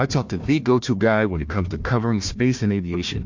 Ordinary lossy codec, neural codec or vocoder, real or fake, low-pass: MP3, 48 kbps; codec, 16 kHz, 1 kbps, FunCodec, trained on Chinese and English, 50 frames a second; fake; 7.2 kHz